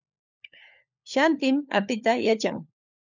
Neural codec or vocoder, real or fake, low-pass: codec, 16 kHz, 4 kbps, FunCodec, trained on LibriTTS, 50 frames a second; fake; 7.2 kHz